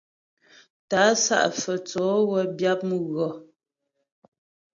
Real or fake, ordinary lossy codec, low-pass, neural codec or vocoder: real; AAC, 64 kbps; 7.2 kHz; none